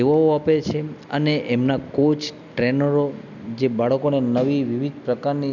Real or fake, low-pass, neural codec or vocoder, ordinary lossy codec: real; 7.2 kHz; none; none